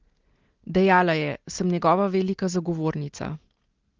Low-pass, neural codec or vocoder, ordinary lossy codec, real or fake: 7.2 kHz; none; Opus, 16 kbps; real